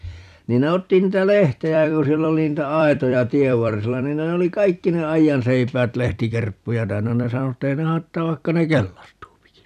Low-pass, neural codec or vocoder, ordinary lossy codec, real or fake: 14.4 kHz; vocoder, 44.1 kHz, 128 mel bands every 256 samples, BigVGAN v2; none; fake